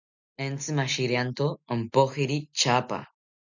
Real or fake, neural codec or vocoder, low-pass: real; none; 7.2 kHz